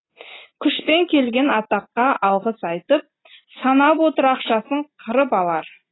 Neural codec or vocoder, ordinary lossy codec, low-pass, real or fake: none; AAC, 16 kbps; 7.2 kHz; real